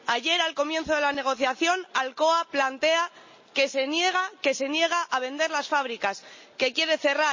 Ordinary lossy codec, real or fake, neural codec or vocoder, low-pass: MP3, 32 kbps; real; none; 7.2 kHz